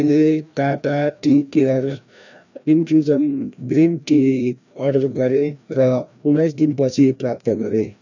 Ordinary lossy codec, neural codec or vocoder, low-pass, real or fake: none; codec, 16 kHz, 1 kbps, FreqCodec, larger model; 7.2 kHz; fake